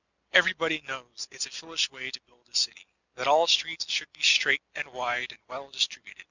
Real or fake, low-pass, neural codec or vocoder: real; 7.2 kHz; none